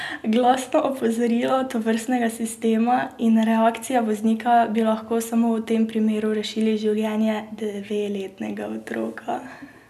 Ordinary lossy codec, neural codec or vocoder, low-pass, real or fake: none; none; 14.4 kHz; real